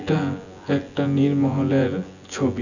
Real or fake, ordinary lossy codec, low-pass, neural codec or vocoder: fake; none; 7.2 kHz; vocoder, 24 kHz, 100 mel bands, Vocos